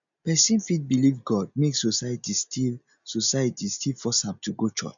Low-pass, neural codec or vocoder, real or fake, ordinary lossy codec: 7.2 kHz; none; real; MP3, 96 kbps